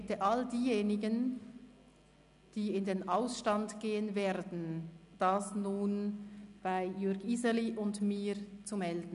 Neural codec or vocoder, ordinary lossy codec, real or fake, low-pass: none; none; real; 10.8 kHz